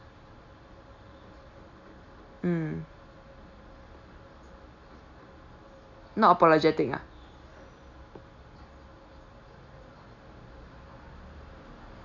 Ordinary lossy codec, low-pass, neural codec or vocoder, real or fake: none; 7.2 kHz; none; real